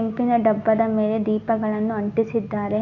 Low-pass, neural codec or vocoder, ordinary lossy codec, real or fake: 7.2 kHz; none; none; real